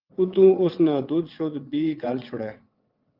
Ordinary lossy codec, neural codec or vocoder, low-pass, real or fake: Opus, 24 kbps; vocoder, 22.05 kHz, 80 mel bands, WaveNeXt; 5.4 kHz; fake